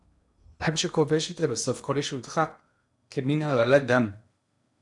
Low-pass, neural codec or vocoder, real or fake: 10.8 kHz; codec, 16 kHz in and 24 kHz out, 0.8 kbps, FocalCodec, streaming, 65536 codes; fake